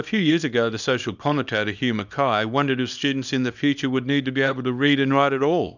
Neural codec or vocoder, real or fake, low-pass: codec, 24 kHz, 0.9 kbps, WavTokenizer, small release; fake; 7.2 kHz